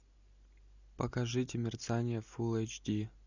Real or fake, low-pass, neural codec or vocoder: real; 7.2 kHz; none